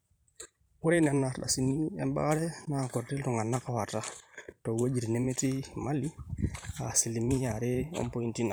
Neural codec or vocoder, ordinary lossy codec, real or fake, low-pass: vocoder, 44.1 kHz, 128 mel bands every 512 samples, BigVGAN v2; none; fake; none